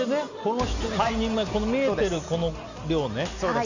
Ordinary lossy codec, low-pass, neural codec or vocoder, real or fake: none; 7.2 kHz; none; real